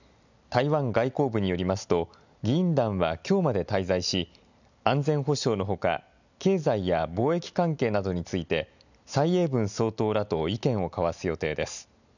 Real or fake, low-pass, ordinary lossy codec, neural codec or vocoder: real; 7.2 kHz; none; none